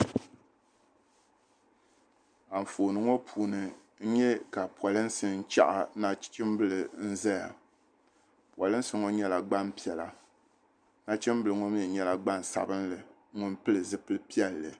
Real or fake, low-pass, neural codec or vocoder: real; 9.9 kHz; none